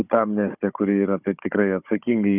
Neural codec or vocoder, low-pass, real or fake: codec, 16 kHz, 16 kbps, FunCodec, trained on Chinese and English, 50 frames a second; 3.6 kHz; fake